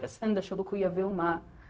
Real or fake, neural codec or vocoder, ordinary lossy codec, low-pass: fake; codec, 16 kHz, 0.4 kbps, LongCat-Audio-Codec; none; none